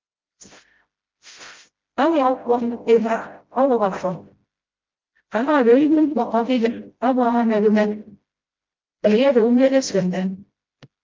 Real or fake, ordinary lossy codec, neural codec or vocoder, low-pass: fake; Opus, 24 kbps; codec, 16 kHz, 0.5 kbps, FreqCodec, smaller model; 7.2 kHz